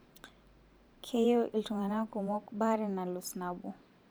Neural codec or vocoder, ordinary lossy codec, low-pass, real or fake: vocoder, 44.1 kHz, 128 mel bands every 512 samples, BigVGAN v2; none; none; fake